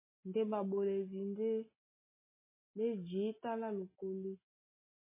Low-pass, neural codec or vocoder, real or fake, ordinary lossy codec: 3.6 kHz; none; real; MP3, 16 kbps